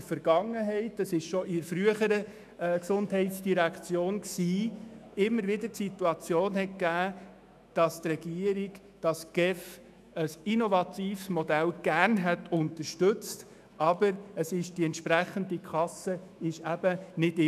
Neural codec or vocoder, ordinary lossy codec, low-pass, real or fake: autoencoder, 48 kHz, 128 numbers a frame, DAC-VAE, trained on Japanese speech; none; 14.4 kHz; fake